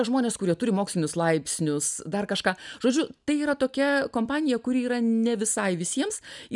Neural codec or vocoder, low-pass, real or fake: none; 10.8 kHz; real